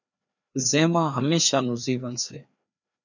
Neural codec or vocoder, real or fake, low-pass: codec, 16 kHz, 2 kbps, FreqCodec, larger model; fake; 7.2 kHz